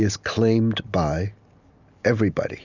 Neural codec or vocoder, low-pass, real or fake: none; 7.2 kHz; real